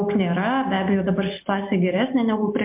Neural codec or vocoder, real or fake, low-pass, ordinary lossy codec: none; real; 3.6 kHz; MP3, 32 kbps